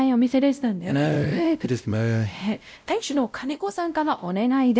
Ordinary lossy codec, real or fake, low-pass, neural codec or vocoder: none; fake; none; codec, 16 kHz, 0.5 kbps, X-Codec, WavLM features, trained on Multilingual LibriSpeech